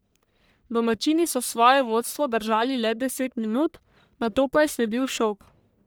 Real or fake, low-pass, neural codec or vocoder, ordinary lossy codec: fake; none; codec, 44.1 kHz, 1.7 kbps, Pupu-Codec; none